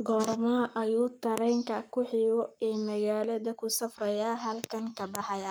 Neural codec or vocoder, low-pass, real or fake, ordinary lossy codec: codec, 44.1 kHz, 7.8 kbps, Pupu-Codec; none; fake; none